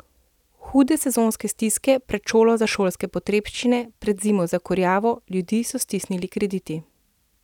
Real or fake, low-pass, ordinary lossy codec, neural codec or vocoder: real; 19.8 kHz; none; none